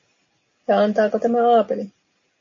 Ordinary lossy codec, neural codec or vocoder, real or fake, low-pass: MP3, 32 kbps; none; real; 7.2 kHz